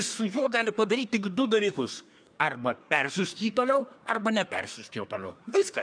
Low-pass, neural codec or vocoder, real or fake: 9.9 kHz; codec, 24 kHz, 1 kbps, SNAC; fake